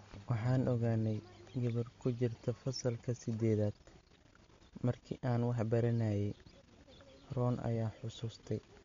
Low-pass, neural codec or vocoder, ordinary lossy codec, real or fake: 7.2 kHz; none; MP3, 48 kbps; real